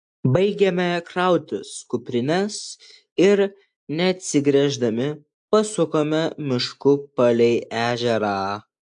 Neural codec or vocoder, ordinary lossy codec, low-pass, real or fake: none; AAC, 64 kbps; 9.9 kHz; real